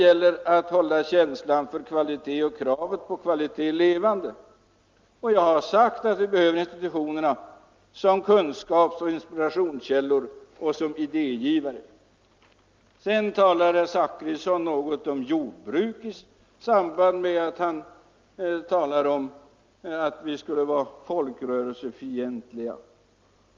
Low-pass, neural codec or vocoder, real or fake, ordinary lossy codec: 7.2 kHz; none; real; Opus, 24 kbps